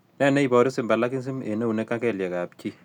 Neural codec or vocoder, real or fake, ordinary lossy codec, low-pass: none; real; none; 19.8 kHz